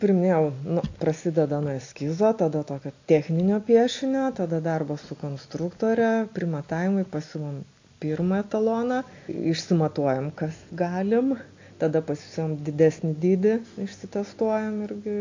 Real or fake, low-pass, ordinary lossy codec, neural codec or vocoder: real; 7.2 kHz; AAC, 48 kbps; none